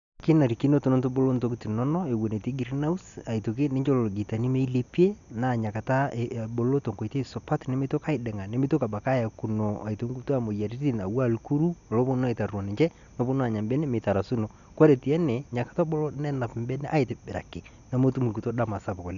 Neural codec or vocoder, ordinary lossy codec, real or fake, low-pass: none; none; real; 7.2 kHz